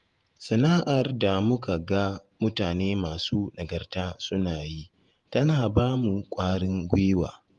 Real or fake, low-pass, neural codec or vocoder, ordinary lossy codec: fake; 10.8 kHz; vocoder, 48 kHz, 128 mel bands, Vocos; Opus, 32 kbps